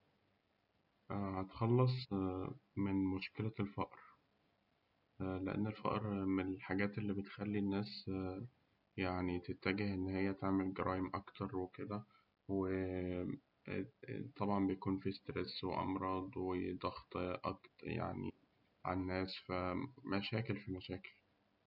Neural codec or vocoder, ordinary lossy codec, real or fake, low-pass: none; none; real; 5.4 kHz